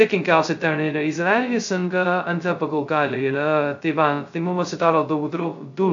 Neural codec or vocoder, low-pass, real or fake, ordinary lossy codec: codec, 16 kHz, 0.2 kbps, FocalCodec; 7.2 kHz; fake; AAC, 64 kbps